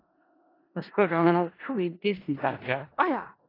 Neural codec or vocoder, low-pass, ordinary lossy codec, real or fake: codec, 16 kHz in and 24 kHz out, 0.4 kbps, LongCat-Audio-Codec, four codebook decoder; 5.4 kHz; AAC, 24 kbps; fake